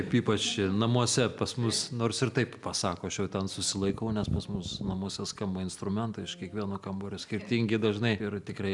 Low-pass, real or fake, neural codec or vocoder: 10.8 kHz; real; none